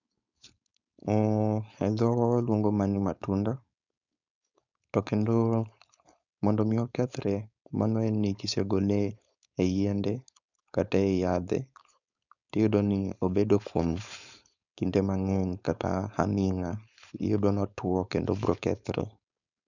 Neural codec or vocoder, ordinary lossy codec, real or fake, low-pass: codec, 16 kHz, 4.8 kbps, FACodec; none; fake; 7.2 kHz